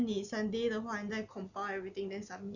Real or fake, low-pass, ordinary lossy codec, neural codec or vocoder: real; 7.2 kHz; none; none